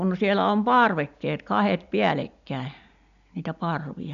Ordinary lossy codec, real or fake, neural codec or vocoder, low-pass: none; real; none; 7.2 kHz